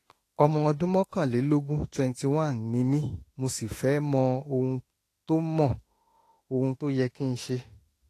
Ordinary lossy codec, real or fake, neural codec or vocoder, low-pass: AAC, 48 kbps; fake; autoencoder, 48 kHz, 32 numbers a frame, DAC-VAE, trained on Japanese speech; 14.4 kHz